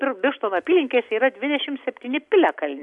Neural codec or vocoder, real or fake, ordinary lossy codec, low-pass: none; real; AAC, 64 kbps; 10.8 kHz